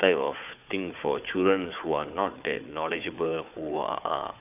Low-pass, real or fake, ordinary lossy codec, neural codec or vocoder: 3.6 kHz; fake; none; codec, 16 kHz, 4 kbps, FunCodec, trained on Chinese and English, 50 frames a second